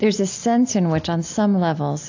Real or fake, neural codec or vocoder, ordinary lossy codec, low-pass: real; none; AAC, 48 kbps; 7.2 kHz